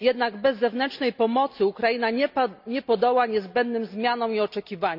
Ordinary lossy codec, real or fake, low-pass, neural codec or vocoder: none; real; 5.4 kHz; none